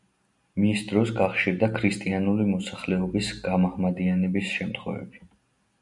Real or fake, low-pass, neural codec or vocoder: real; 10.8 kHz; none